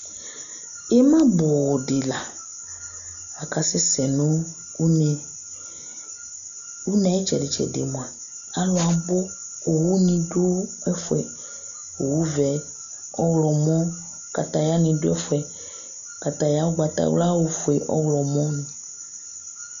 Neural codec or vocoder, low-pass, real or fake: none; 7.2 kHz; real